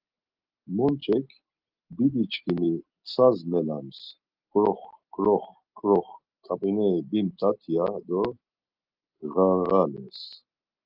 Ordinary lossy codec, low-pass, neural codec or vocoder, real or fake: Opus, 24 kbps; 5.4 kHz; none; real